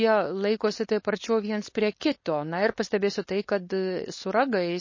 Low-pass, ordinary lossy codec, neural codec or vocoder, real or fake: 7.2 kHz; MP3, 32 kbps; codec, 16 kHz, 4.8 kbps, FACodec; fake